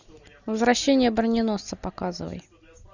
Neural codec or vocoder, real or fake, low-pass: none; real; 7.2 kHz